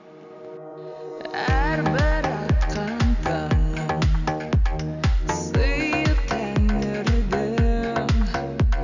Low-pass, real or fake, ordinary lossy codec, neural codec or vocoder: 7.2 kHz; real; none; none